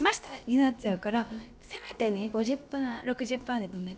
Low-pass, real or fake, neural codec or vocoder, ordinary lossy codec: none; fake; codec, 16 kHz, about 1 kbps, DyCAST, with the encoder's durations; none